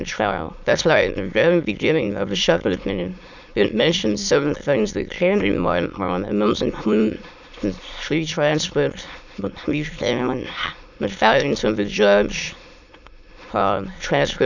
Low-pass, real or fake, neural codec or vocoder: 7.2 kHz; fake; autoencoder, 22.05 kHz, a latent of 192 numbers a frame, VITS, trained on many speakers